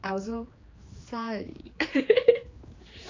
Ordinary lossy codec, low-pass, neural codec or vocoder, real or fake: AAC, 32 kbps; 7.2 kHz; codec, 16 kHz, 2 kbps, X-Codec, HuBERT features, trained on general audio; fake